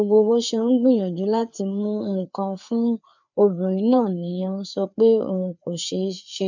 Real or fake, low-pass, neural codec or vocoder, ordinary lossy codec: fake; 7.2 kHz; codec, 16 kHz, 4 kbps, FreqCodec, larger model; none